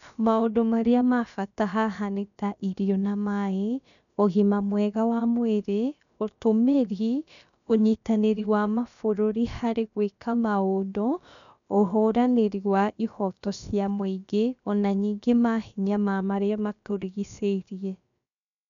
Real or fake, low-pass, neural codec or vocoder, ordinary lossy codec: fake; 7.2 kHz; codec, 16 kHz, about 1 kbps, DyCAST, with the encoder's durations; none